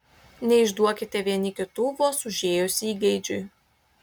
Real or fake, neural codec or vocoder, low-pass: real; none; 19.8 kHz